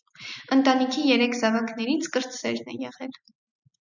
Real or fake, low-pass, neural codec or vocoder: real; 7.2 kHz; none